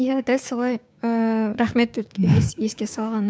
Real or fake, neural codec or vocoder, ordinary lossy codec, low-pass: fake; codec, 16 kHz, 6 kbps, DAC; none; none